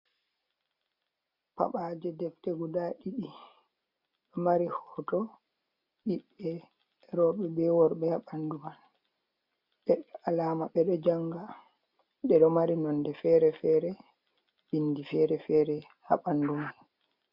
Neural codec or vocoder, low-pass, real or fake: none; 5.4 kHz; real